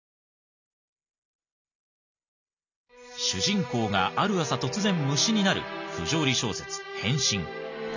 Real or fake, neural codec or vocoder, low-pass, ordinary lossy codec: real; none; 7.2 kHz; none